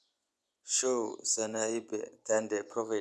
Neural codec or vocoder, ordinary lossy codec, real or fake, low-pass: vocoder, 24 kHz, 100 mel bands, Vocos; none; fake; none